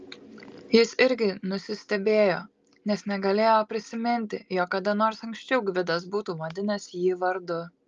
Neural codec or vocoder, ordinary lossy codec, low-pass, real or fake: none; Opus, 32 kbps; 7.2 kHz; real